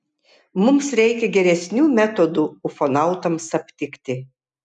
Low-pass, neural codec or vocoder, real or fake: 9.9 kHz; none; real